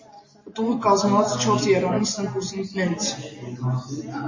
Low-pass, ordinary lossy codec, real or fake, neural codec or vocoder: 7.2 kHz; MP3, 32 kbps; real; none